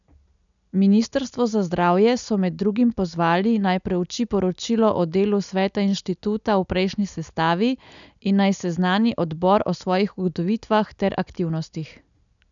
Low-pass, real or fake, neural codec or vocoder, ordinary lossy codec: 7.2 kHz; real; none; none